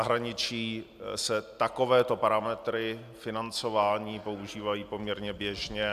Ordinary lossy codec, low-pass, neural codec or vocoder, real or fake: AAC, 96 kbps; 14.4 kHz; none; real